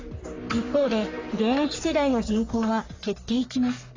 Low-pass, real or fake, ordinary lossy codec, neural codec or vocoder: 7.2 kHz; fake; AAC, 32 kbps; codec, 44.1 kHz, 3.4 kbps, Pupu-Codec